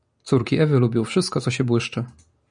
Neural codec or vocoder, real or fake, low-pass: none; real; 9.9 kHz